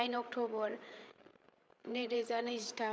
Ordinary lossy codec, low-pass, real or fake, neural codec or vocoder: none; none; fake; codec, 16 kHz, 8 kbps, FreqCodec, larger model